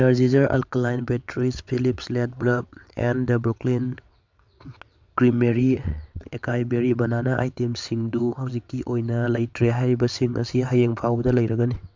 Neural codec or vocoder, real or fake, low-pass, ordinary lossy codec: vocoder, 22.05 kHz, 80 mel bands, WaveNeXt; fake; 7.2 kHz; MP3, 64 kbps